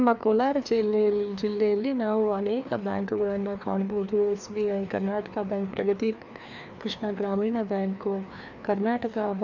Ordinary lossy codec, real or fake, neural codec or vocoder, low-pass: none; fake; codec, 16 kHz, 2 kbps, FreqCodec, larger model; 7.2 kHz